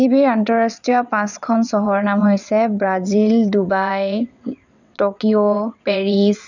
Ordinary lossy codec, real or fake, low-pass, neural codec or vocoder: none; fake; 7.2 kHz; vocoder, 22.05 kHz, 80 mel bands, WaveNeXt